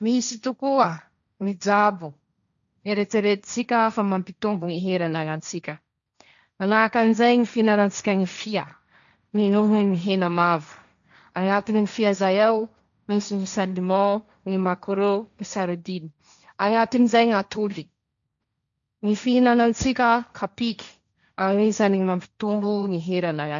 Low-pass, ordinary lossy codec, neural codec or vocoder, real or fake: 7.2 kHz; none; codec, 16 kHz, 1.1 kbps, Voila-Tokenizer; fake